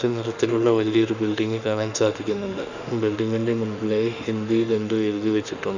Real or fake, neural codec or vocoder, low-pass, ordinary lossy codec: fake; autoencoder, 48 kHz, 32 numbers a frame, DAC-VAE, trained on Japanese speech; 7.2 kHz; none